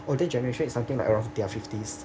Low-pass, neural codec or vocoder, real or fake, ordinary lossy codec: none; none; real; none